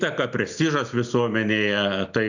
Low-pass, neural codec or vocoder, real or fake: 7.2 kHz; none; real